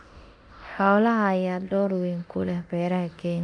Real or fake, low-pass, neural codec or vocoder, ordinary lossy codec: fake; 9.9 kHz; codec, 24 kHz, 0.9 kbps, DualCodec; none